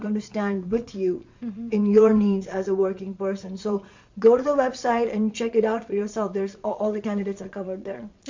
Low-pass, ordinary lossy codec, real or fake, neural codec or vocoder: 7.2 kHz; MP3, 48 kbps; fake; vocoder, 22.05 kHz, 80 mel bands, Vocos